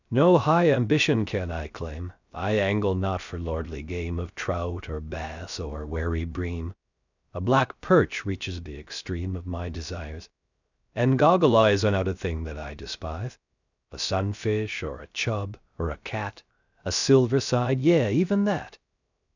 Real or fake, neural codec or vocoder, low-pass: fake; codec, 16 kHz, 0.3 kbps, FocalCodec; 7.2 kHz